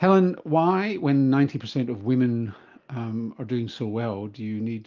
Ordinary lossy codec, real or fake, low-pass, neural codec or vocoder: Opus, 24 kbps; real; 7.2 kHz; none